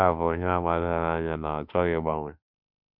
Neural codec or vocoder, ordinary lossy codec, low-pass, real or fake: autoencoder, 48 kHz, 32 numbers a frame, DAC-VAE, trained on Japanese speech; none; 5.4 kHz; fake